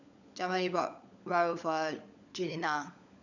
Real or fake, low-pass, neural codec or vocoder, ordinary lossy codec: fake; 7.2 kHz; codec, 16 kHz, 16 kbps, FunCodec, trained on LibriTTS, 50 frames a second; none